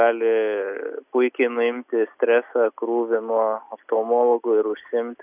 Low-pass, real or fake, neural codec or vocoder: 3.6 kHz; real; none